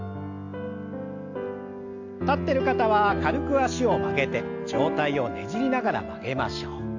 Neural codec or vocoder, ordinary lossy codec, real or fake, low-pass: none; AAC, 48 kbps; real; 7.2 kHz